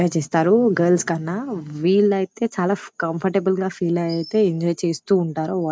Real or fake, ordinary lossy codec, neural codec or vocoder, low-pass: real; none; none; none